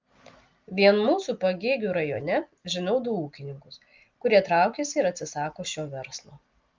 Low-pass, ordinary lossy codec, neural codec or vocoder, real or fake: 7.2 kHz; Opus, 32 kbps; none; real